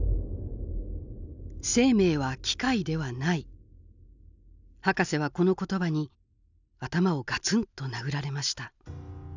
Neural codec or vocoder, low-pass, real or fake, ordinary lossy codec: none; 7.2 kHz; real; none